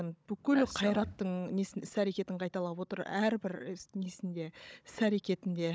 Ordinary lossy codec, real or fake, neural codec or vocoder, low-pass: none; fake; codec, 16 kHz, 16 kbps, FunCodec, trained on Chinese and English, 50 frames a second; none